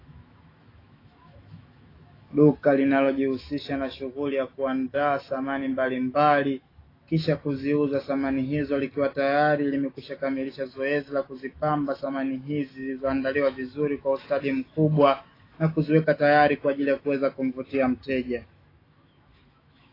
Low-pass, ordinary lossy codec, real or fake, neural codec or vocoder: 5.4 kHz; AAC, 24 kbps; fake; autoencoder, 48 kHz, 128 numbers a frame, DAC-VAE, trained on Japanese speech